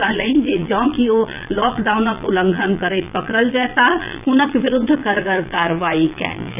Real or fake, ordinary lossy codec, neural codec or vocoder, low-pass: fake; AAC, 32 kbps; vocoder, 22.05 kHz, 80 mel bands, Vocos; 3.6 kHz